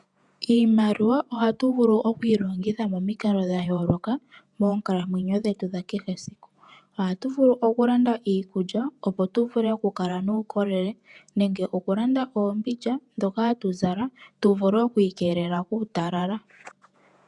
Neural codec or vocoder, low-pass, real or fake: vocoder, 48 kHz, 128 mel bands, Vocos; 10.8 kHz; fake